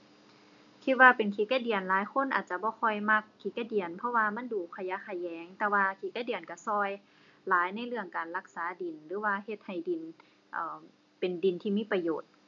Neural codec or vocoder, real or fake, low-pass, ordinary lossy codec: none; real; 7.2 kHz; MP3, 96 kbps